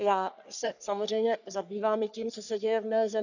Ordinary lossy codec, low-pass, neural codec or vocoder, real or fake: none; 7.2 kHz; codec, 44.1 kHz, 3.4 kbps, Pupu-Codec; fake